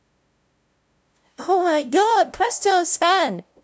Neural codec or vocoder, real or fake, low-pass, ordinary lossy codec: codec, 16 kHz, 0.5 kbps, FunCodec, trained on LibriTTS, 25 frames a second; fake; none; none